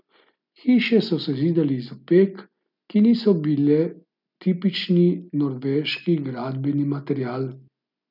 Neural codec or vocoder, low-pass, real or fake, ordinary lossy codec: none; 5.4 kHz; real; none